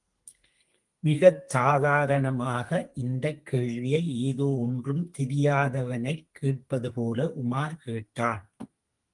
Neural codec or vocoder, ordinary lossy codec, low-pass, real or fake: codec, 32 kHz, 1.9 kbps, SNAC; Opus, 24 kbps; 10.8 kHz; fake